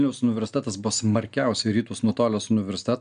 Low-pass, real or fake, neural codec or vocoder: 9.9 kHz; real; none